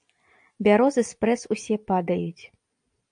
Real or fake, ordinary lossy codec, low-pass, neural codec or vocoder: real; AAC, 64 kbps; 9.9 kHz; none